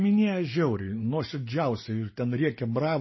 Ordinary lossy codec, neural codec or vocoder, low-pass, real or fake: MP3, 24 kbps; none; 7.2 kHz; real